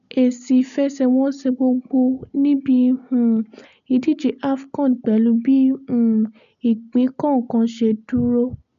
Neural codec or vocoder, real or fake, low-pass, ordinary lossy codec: none; real; 7.2 kHz; none